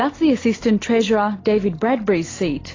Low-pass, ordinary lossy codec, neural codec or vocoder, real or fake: 7.2 kHz; AAC, 32 kbps; none; real